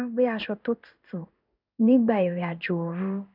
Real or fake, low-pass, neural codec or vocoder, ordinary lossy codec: fake; 5.4 kHz; codec, 16 kHz in and 24 kHz out, 1 kbps, XY-Tokenizer; none